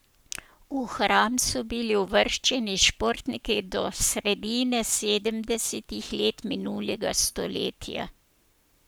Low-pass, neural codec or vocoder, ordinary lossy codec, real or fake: none; codec, 44.1 kHz, 7.8 kbps, Pupu-Codec; none; fake